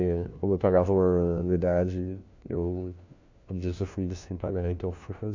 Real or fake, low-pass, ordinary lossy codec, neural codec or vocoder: fake; 7.2 kHz; MP3, 64 kbps; codec, 16 kHz, 1 kbps, FunCodec, trained on LibriTTS, 50 frames a second